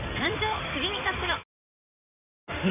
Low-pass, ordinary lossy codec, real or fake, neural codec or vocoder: 3.6 kHz; none; fake; codec, 16 kHz in and 24 kHz out, 2.2 kbps, FireRedTTS-2 codec